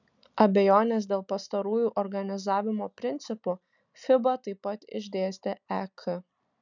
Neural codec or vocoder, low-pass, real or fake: none; 7.2 kHz; real